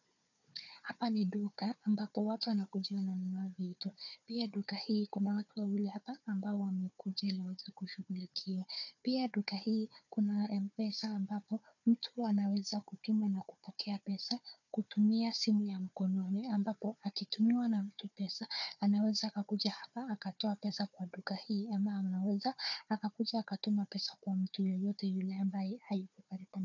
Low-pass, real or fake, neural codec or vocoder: 7.2 kHz; fake; codec, 16 kHz, 4 kbps, FunCodec, trained on Chinese and English, 50 frames a second